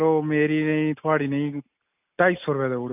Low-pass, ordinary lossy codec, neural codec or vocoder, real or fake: 3.6 kHz; none; none; real